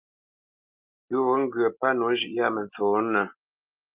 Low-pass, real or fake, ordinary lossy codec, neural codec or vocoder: 3.6 kHz; real; Opus, 24 kbps; none